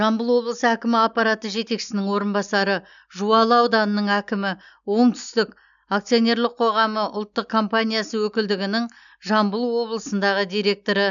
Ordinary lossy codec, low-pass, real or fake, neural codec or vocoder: none; 7.2 kHz; real; none